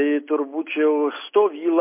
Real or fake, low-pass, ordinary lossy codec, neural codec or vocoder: real; 3.6 kHz; MP3, 24 kbps; none